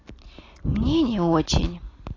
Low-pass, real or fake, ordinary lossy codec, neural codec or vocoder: 7.2 kHz; real; AAC, 32 kbps; none